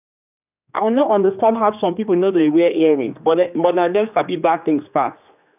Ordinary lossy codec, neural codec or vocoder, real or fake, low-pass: none; codec, 16 kHz, 2 kbps, X-Codec, HuBERT features, trained on general audio; fake; 3.6 kHz